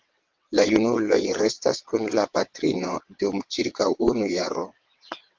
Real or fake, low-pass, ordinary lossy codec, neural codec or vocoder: fake; 7.2 kHz; Opus, 24 kbps; vocoder, 22.05 kHz, 80 mel bands, WaveNeXt